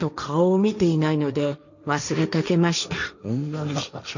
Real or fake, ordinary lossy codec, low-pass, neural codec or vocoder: fake; none; 7.2 kHz; codec, 16 kHz, 1.1 kbps, Voila-Tokenizer